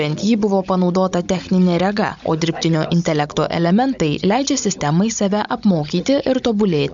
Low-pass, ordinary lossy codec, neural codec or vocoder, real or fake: 7.2 kHz; MP3, 64 kbps; codec, 16 kHz, 16 kbps, FunCodec, trained on Chinese and English, 50 frames a second; fake